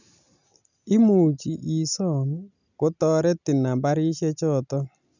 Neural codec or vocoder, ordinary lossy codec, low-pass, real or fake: none; none; 7.2 kHz; real